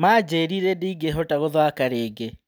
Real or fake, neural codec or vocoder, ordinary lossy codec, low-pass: real; none; none; none